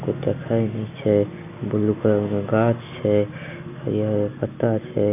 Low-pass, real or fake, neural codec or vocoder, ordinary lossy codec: 3.6 kHz; real; none; none